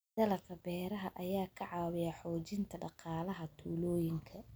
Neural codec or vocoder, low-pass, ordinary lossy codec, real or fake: none; none; none; real